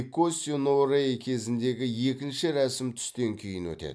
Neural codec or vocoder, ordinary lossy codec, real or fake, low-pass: none; none; real; none